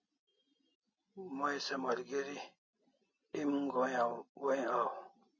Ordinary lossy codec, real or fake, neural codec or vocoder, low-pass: MP3, 32 kbps; real; none; 7.2 kHz